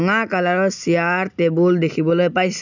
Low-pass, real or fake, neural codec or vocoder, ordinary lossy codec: 7.2 kHz; real; none; none